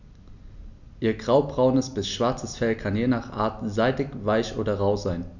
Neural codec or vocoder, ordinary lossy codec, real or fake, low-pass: none; none; real; 7.2 kHz